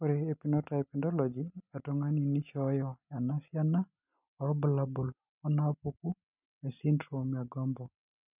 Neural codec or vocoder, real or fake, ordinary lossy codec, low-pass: none; real; none; 3.6 kHz